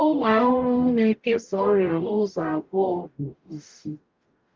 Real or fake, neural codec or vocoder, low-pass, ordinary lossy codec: fake; codec, 44.1 kHz, 0.9 kbps, DAC; 7.2 kHz; Opus, 24 kbps